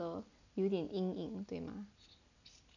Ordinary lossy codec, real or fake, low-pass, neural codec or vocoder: none; real; 7.2 kHz; none